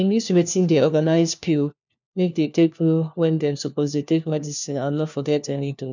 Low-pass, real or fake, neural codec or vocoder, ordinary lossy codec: 7.2 kHz; fake; codec, 16 kHz, 1 kbps, FunCodec, trained on LibriTTS, 50 frames a second; none